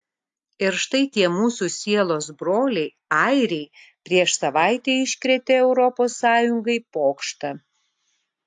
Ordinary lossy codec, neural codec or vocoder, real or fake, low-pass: AAC, 64 kbps; none; real; 10.8 kHz